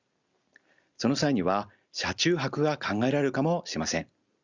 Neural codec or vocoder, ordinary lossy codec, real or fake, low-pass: none; Opus, 64 kbps; real; 7.2 kHz